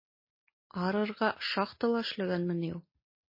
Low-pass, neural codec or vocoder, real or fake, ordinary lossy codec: 5.4 kHz; none; real; MP3, 24 kbps